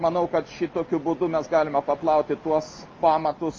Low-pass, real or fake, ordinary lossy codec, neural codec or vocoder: 7.2 kHz; real; Opus, 24 kbps; none